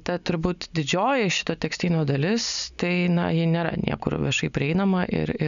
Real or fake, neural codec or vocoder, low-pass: real; none; 7.2 kHz